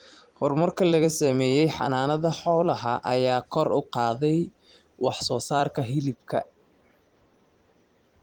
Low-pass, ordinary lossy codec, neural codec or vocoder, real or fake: 19.8 kHz; Opus, 24 kbps; vocoder, 44.1 kHz, 128 mel bands every 256 samples, BigVGAN v2; fake